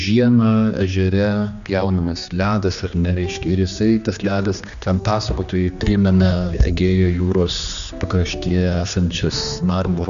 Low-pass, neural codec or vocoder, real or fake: 7.2 kHz; codec, 16 kHz, 2 kbps, X-Codec, HuBERT features, trained on general audio; fake